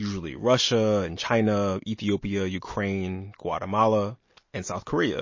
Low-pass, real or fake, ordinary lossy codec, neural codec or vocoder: 7.2 kHz; real; MP3, 32 kbps; none